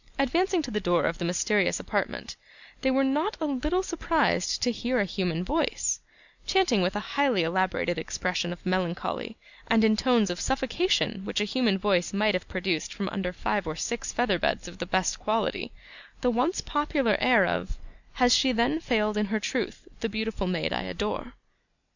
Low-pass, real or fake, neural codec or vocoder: 7.2 kHz; real; none